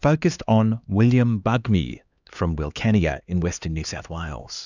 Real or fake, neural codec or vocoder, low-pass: fake; codec, 16 kHz, 2 kbps, X-Codec, HuBERT features, trained on LibriSpeech; 7.2 kHz